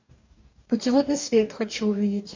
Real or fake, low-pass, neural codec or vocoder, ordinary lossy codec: fake; 7.2 kHz; codec, 44.1 kHz, 2.6 kbps, DAC; MP3, 64 kbps